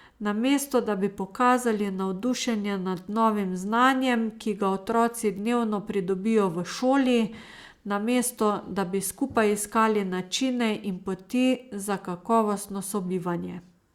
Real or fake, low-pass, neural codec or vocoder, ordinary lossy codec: real; 19.8 kHz; none; Opus, 64 kbps